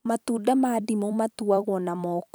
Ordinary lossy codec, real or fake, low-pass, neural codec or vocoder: none; fake; none; vocoder, 44.1 kHz, 128 mel bands every 256 samples, BigVGAN v2